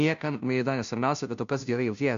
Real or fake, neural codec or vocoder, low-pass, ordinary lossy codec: fake; codec, 16 kHz, 0.5 kbps, FunCodec, trained on Chinese and English, 25 frames a second; 7.2 kHz; MP3, 64 kbps